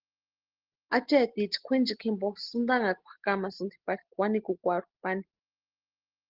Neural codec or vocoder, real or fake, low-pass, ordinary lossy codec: none; real; 5.4 kHz; Opus, 16 kbps